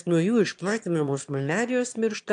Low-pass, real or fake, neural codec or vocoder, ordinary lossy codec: 9.9 kHz; fake; autoencoder, 22.05 kHz, a latent of 192 numbers a frame, VITS, trained on one speaker; AAC, 64 kbps